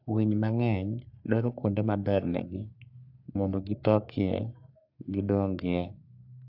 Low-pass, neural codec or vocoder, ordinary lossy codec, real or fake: 5.4 kHz; codec, 44.1 kHz, 3.4 kbps, Pupu-Codec; AAC, 48 kbps; fake